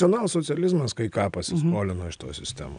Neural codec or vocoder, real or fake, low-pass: none; real; 9.9 kHz